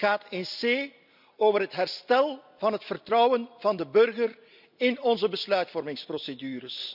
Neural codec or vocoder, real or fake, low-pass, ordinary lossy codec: none; real; 5.4 kHz; none